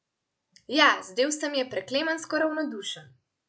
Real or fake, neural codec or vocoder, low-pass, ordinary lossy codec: real; none; none; none